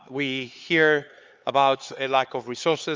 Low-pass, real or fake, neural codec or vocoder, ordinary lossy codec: 7.2 kHz; fake; codec, 16 kHz, 4 kbps, X-Codec, HuBERT features, trained on LibriSpeech; Opus, 32 kbps